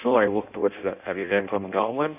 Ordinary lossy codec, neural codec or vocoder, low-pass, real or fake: none; codec, 16 kHz in and 24 kHz out, 0.6 kbps, FireRedTTS-2 codec; 3.6 kHz; fake